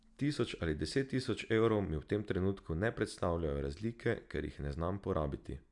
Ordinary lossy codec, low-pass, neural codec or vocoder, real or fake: none; 10.8 kHz; none; real